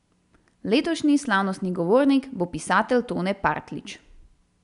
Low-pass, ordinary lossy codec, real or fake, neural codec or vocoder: 10.8 kHz; none; real; none